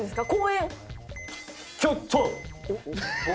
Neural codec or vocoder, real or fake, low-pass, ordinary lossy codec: none; real; none; none